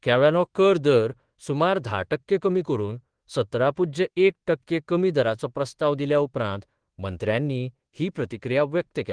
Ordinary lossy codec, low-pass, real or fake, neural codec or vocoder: Opus, 16 kbps; 9.9 kHz; fake; codec, 24 kHz, 1.2 kbps, DualCodec